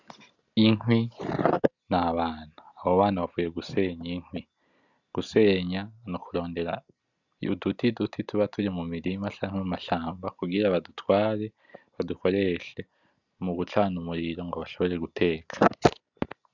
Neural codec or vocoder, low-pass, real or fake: none; 7.2 kHz; real